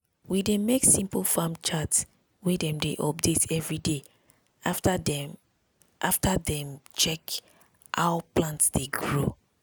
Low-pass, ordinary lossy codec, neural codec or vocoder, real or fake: none; none; none; real